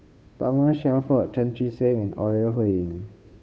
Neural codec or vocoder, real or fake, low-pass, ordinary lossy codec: codec, 16 kHz, 2 kbps, FunCodec, trained on Chinese and English, 25 frames a second; fake; none; none